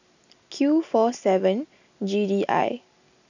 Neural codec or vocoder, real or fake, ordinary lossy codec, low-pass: none; real; none; 7.2 kHz